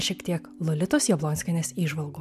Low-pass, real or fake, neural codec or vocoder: 14.4 kHz; real; none